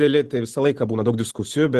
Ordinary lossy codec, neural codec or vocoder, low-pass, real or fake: Opus, 16 kbps; none; 14.4 kHz; real